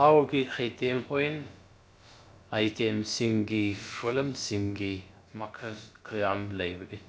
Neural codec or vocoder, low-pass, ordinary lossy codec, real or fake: codec, 16 kHz, about 1 kbps, DyCAST, with the encoder's durations; none; none; fake